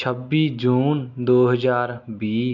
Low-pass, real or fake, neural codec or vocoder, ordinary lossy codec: 7.2 kHz; real; none; none